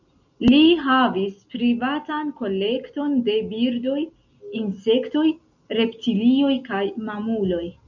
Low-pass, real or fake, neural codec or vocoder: 7.2 kHz; real; none